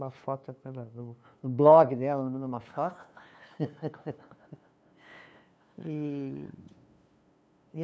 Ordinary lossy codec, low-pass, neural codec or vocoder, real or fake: none; none; codec, 16 kHz, 2 kbps, FunCodec, trained on LibriTTS, 25 frames a second; fake